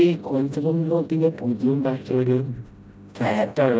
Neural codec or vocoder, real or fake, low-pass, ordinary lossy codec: codec, 16 kHz, 0.5 kbps, FreqCodec, smaller model; fake; none; none